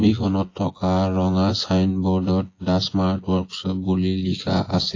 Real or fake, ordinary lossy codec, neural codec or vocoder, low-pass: fake; AAC, 32 kbps; vocoder, 24 kHz, 100 mel bands, Vocos; 7.2 kHz